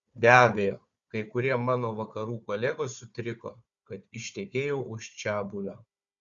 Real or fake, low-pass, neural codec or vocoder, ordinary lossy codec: fake; 7.2 kHz; codec, 16 kHz, 4 kbps, FunCodec, trained on Chinese and English, 50 frames a second; Opus, 64 kbps